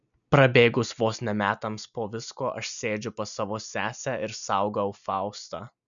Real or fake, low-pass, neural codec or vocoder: real; 7.2 kHz; none